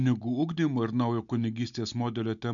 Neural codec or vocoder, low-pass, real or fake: none; 7.2 kHz; real